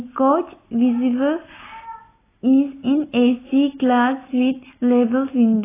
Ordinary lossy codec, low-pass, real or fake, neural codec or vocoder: AAC, 16 kbps; 3.6 kHz; real; none